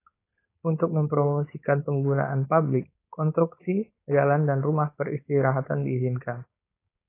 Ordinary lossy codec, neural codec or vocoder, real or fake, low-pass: AAC, 24 kbps; codec, 16 kHz, 4.8 kbps, FACodec; fake; 3.6 kHz